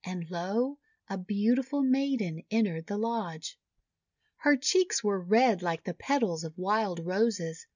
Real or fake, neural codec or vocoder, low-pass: real; none; 7.2 kHz